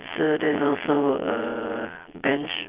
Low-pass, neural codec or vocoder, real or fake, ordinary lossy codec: 3.6 kHz; vocoder, 22.05 kHz, 80 mel bands, Vocos; fake; Opus, 24 kbps